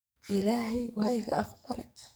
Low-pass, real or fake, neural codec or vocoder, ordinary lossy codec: none; fake; codec, 44.1 kHz, 2.6 kbps, SNAC; none